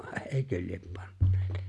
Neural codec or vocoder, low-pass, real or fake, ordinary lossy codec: none; none; real; none